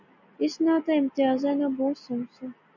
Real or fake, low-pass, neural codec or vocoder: real; 7.2 kHz; none